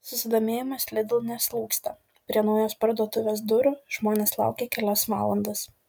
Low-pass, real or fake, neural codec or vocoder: 19.8 kHz; real; none